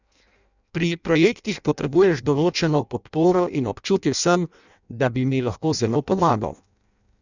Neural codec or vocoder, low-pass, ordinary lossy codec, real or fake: codec, 16 kHz in and 24 kHz out, 0.6 kbps, FireRedTTS-2 codec; 7.2 kHz; none; fake